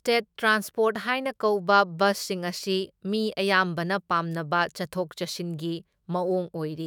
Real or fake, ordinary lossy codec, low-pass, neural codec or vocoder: fake; none; none; autoencoder, 48 kHz, 128 numbers a frame, DAC-VAE, trained on Japanese speech